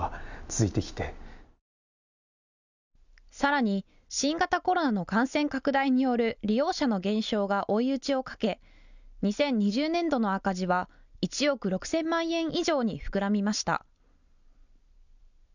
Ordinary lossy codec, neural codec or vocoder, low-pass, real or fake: none; none; 7.2 kHz; real